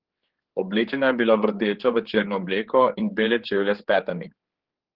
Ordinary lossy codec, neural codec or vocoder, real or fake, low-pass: Opus, 16 kbps; codec, 16 kHz, 4 kbps, X-Codec, HuBERT features, trained on general audio; fake; 5.4 kHz